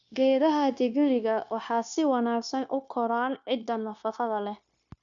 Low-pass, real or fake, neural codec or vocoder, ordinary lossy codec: 7.2 kHz; fake; codec, 16 kHz, 0.9 kbps, LongCat-Audio-Codec; none